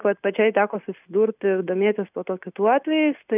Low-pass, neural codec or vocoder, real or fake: 3.6 kHz; none; real